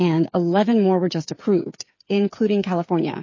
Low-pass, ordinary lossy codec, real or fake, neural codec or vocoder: 7.2 kHz; MP3, 32 kbps; fake; codec, 16 kHz, 8 kbps, FreqCodec, smaller model